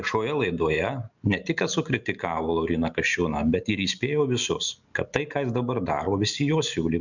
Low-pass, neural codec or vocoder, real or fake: 7.2 kHz; none; real